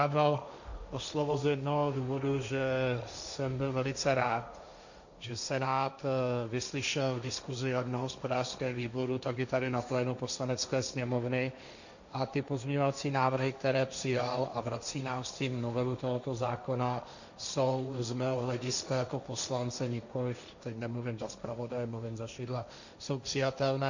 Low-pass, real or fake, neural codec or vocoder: 7.2 kHz; fake; codec, 16 kHz, 1.1 kbps, Voila-Tokenizer